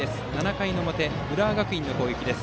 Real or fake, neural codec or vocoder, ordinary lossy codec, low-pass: real; none; none; none